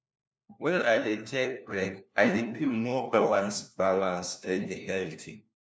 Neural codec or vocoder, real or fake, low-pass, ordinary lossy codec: codec, 16 kHz, 1 kbps, FunCodec, trained on LibriTTS, 50 frames a second; fake; none; none